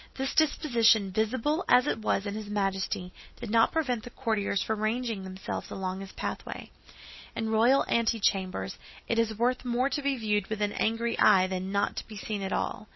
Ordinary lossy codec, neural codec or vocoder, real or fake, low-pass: MP3, 24 kbps; none; real; 7.2 kHz